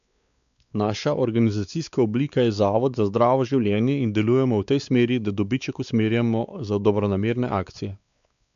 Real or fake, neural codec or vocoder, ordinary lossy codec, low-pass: fake; codec, 16 kHz, 4 kbps, X-Codec, WavLM features, trained on Multilingual LibriSpeech; none; 7.2 kHz